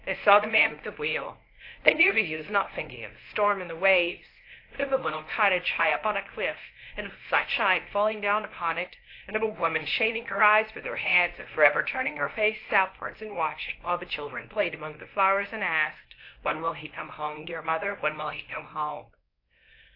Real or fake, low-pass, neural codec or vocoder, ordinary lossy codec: fake; 5.4 kHz; codec, 24 kHz, 0.9 kbps, WavTokenizer, medium speech release version 1; AAC, 32 kbps